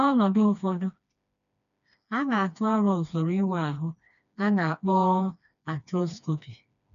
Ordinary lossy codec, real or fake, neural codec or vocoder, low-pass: none; fake; codec, 16 kHz, 2 kbps, FreqCodec, smaller model; 7.2 kHz